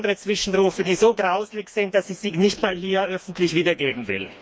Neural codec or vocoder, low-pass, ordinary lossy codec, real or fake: codec, 16 kHz, 2 kbps, FreqCodec, smaller model; none; none; fake